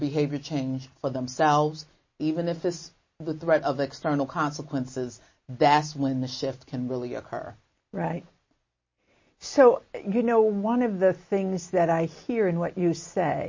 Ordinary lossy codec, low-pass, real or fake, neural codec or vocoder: MP3, 32 kbps; 7.2 kHz; real; none